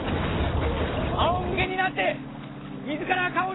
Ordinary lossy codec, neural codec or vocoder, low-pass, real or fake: AAC, 16 kbps; none; 7.2 kHz; real